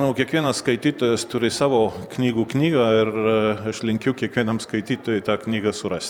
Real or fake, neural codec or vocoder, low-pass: fake; vocoder, 44.1 kHz, 128 mel bands every 512 samples, BigVGAN v2; 19.8 kHz